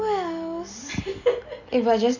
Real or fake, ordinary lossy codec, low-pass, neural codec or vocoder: real; none; 7.2 kHz; none